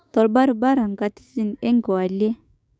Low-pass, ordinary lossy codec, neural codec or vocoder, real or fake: none; none; codec, 16 kHz, 6 kbps, DAC; fake